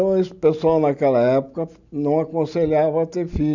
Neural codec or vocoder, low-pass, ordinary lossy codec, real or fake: none; 7.2 kHz; none; real